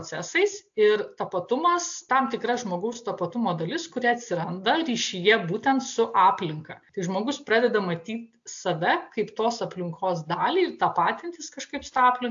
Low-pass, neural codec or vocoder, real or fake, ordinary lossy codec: 7.2 kHz; none; real; AAC, 64 kbps